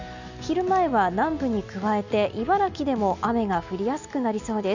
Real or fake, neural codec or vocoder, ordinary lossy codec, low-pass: real; none; none; 7.2 kHz